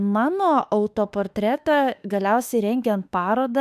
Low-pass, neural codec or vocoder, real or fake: 14.4 kHz; autoencoder, 48 kHz, 32 numbers a frame, DAC-VAE, trained on Japanese speech; fake